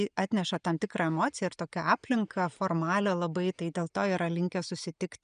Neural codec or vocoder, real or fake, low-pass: none; real; 9.9 kHz